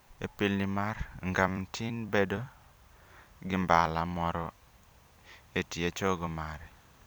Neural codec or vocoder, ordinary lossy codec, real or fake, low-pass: vocoder, 44.1 kHz, 128 mel bands every 256 samples, BigVGAN v2; none; fake; none